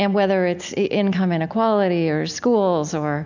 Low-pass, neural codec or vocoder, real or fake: 7.2 kHz; none; real